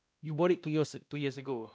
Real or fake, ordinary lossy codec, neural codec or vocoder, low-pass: fake; none; codec, 16 kHz, 1 kbps, X-Codec, WavLM features, trained on Multilingual LibriSpeech; none